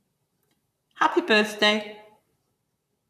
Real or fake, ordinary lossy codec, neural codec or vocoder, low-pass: fake; none; vocoder, 44.1 kHz, 128 mel bands, Pupu-Vocoder; 14.4 kHz